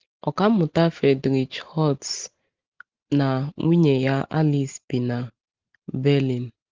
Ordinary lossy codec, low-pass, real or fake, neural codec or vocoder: Opus, 16 kbps; 7.2 kHz; real; none